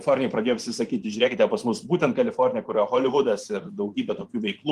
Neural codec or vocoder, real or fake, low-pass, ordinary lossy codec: vocoder, 44.1 kHz, 128 mel bands every 512 samples, BigVGAN v2; fake; 14.4 kHz; Opus, 24 kbps